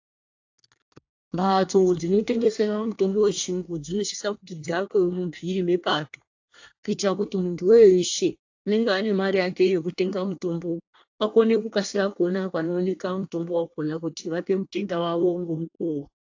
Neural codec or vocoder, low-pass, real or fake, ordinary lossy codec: codec, 24 kHz, 1 kbps, SNAC; 7.2 kHz; fake; AAC, 48 kbps